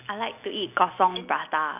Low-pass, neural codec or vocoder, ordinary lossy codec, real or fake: 3.6 kHz; none; none; real